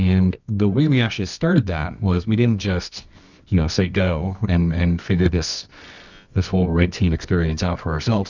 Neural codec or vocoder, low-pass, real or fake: codec, 24 kHz, 0.9 kbps, WavTokenizer, medium music audio release; 7.2 kHz; fake